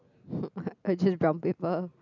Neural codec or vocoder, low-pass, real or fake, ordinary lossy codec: none; 7.2 kHz; real; none